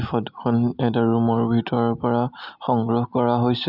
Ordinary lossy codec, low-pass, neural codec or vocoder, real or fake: none; 5.4 kHz; none; real